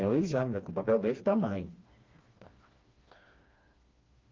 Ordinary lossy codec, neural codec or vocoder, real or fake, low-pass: Opus, 32 kbps; codec, 16 kHz, 2 kbps, FreqCodec, smaller model; fake; 7.2 kHz